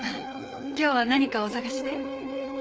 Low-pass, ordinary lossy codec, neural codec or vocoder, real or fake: none; none; codec, 16 kHz, 4 kbps, FreqCodec, larger model; fake